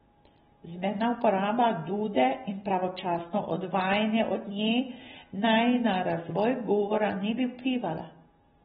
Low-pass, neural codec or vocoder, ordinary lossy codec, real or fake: 7.2 kHz; none; AAC, 16 kbps; real